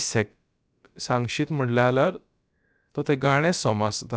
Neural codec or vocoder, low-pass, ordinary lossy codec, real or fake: codec, 16 kHz, about 1 kbps, DyCAST, with the encoder's durations; none; none; fake